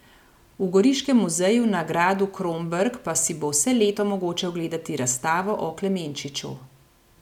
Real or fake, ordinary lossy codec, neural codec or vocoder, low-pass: real; none; none; 19.8 kHz